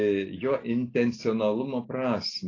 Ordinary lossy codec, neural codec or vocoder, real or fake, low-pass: AAC, 32 kbps; none; real; 7.2 kHz